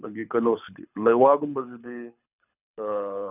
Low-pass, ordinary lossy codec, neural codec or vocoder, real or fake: 3.6 kHz; none; codec, 24 kHz, 6 kbps, HILCodec; fake